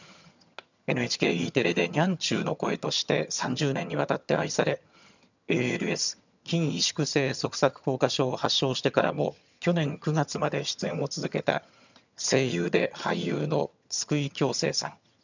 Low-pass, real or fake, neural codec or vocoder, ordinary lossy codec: 7.2 kHz; fake; vocoder, 22.05 kHz, 80 mel bands, HiFi-GAN; none